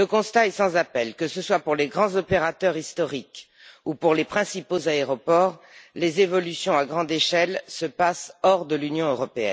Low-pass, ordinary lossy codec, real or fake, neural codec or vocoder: none; none; real; none